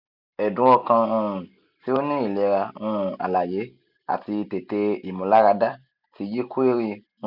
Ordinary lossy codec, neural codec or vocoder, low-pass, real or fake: none; none; 5.4 kHz; real